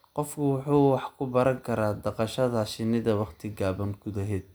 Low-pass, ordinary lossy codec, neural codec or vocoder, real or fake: none; none; none; real